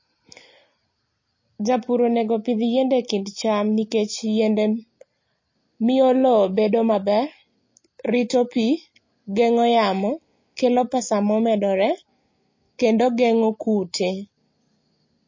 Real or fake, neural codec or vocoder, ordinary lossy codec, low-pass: real; none; MP3, 32 kbps; 7.2 kHz